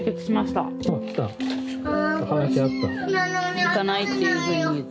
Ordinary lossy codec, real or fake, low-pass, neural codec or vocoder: none; real; none; none